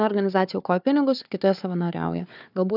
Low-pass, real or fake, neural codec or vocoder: 5.4 kHz; fake; codec, 16 kHz, 4 kbps, FunCodec, trained on Chinese and English, 50 frames a second